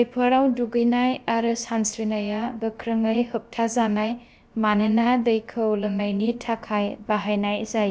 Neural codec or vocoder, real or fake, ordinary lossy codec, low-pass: codec, 16 kHz, about 1 kbps, DyCAST, with the encoder's durations; fake; none; none